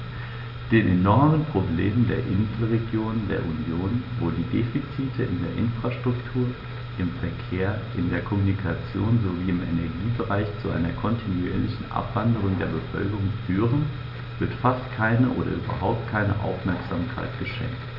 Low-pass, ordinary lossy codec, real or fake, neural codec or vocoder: 5.4 kHz; none; real; none